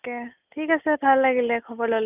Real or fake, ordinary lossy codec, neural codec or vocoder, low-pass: real; none; none; 3.6 kHz